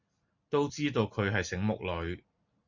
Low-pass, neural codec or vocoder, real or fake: 7.2 kHz; none; real